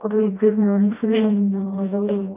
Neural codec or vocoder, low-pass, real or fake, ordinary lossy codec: codec, 16 kHz, 1 kbps, FreqCodec, smaller model; 3.6 kHz; fake; none